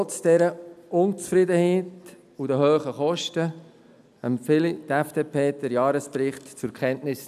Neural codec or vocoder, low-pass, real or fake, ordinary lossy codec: none; 14.4 kHz; real; none